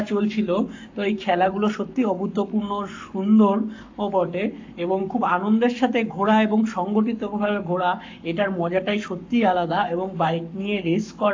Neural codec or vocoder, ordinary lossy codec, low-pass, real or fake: codec, 44.1 kHz, 7.8 kbps, Pupu-Codec; AAC, 48 kbps; 7.2 kHz; fake